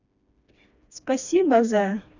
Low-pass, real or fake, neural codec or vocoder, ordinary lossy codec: 7.2 kHz; fake; codec, 16 kHz, 2 kbps, FreqCodec, smaller model; none